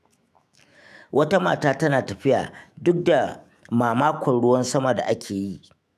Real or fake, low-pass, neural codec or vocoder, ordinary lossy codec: fake; 14.4 kHz; autoencoder, 48 kHz, 128 numbers a frame, DAC-VAE, trained on Japanese speech; none